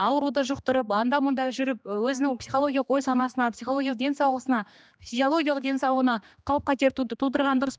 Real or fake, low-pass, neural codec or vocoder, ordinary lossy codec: fake; none; codec, 16 kHz, 2 kbps, X-Codec, HuBERT features, trained on general audio; none